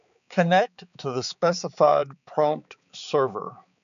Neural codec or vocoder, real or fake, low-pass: codec, 16 kHz, 4 kbps, X-Codec, HuBERT features, trained on balanced general audio; fake; 7.2 kHz